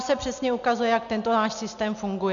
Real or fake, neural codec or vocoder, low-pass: real; none; 7.2 kHz